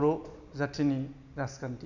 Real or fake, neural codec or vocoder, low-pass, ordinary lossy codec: real; none; 7.2 kHz; none